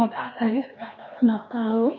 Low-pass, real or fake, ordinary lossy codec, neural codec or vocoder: 7.2 kHz; fake; none; codec, 16 kHz, 2 kbps, X-Codec, HuBERT features, trained on LibriSpeech